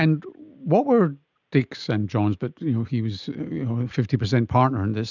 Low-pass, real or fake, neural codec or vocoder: 7.2 kHz; real; none